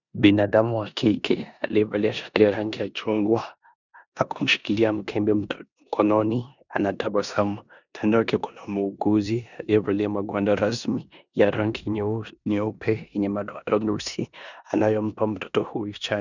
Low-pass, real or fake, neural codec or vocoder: 7.2 kHz; fake; codec, 16 kHz in and 24 kHz out, 0.9 kbps, LongCat-Audio-Codec, four codebook decoder